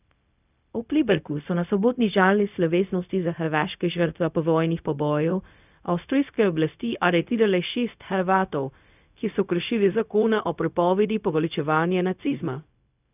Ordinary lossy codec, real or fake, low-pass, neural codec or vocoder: none; fake; 3.6 kHz; codec, 16 kHz, 0.4 kbps, LongCat-Audio-Codec